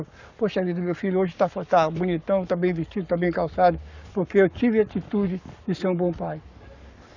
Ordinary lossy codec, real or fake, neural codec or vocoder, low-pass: none; fake; codec, 44.1 kHz, 7.8 kbps, Pupu-Codec; 7.2 kHz